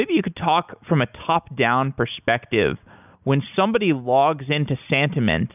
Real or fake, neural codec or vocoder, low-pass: real; none; 3.6 kHz